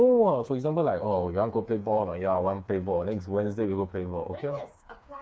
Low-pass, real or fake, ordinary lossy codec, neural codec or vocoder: none; fake; none; codec, 16 kHz, 4 kbps, FreqCodec, smaller model